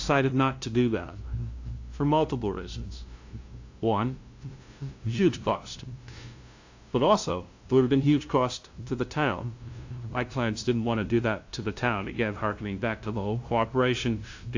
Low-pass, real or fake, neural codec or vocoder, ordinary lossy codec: 7.2 kHz; fake; codec, 16 kHz, 0.5 kbps, FunCodec, trained on LibriTTS, 25 frames a second; AAC, 48 kbps